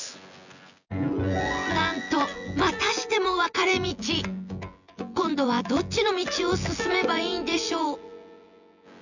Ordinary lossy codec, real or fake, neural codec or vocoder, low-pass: none; fake; vocoder, 24 kHz, 100 mel bands, Vocos; 7.2 kHz